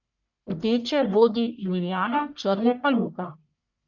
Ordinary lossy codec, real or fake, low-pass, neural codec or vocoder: none; fake; 7.2 kHz; codec, 44.1 kHz, 1.7 kbps, Pupu-Codec